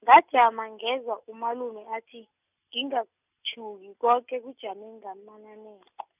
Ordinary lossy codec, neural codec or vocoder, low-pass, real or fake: none; none; 3.6 kHz; real